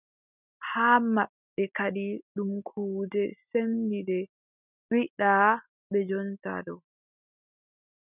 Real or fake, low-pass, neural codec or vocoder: real; 3.6 kHz; none